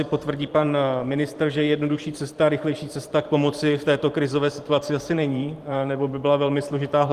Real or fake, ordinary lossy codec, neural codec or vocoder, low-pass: fake; Opus, 24 kbps; vocoder, 44.1 kHz, 128 mel bands every 512 samples, BigVGAN v2; 14.4 kHz